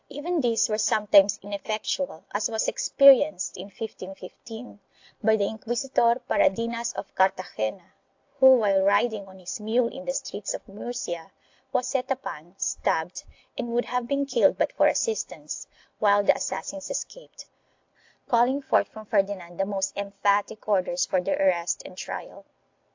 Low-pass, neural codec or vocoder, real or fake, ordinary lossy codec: 7.2 kHz; none; real; AAC, 48 kbps